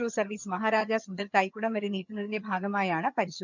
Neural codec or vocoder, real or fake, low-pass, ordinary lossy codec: vocoder, 22.05 kHz, 80 mel bands, HiFi-GAN; fake; 7.2 kHz; none